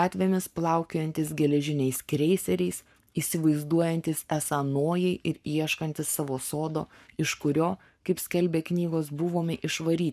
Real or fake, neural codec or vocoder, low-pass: fake; codec, 44.1 kHz, 7.8 kbps, Pupu-Codec; 14.4 kHz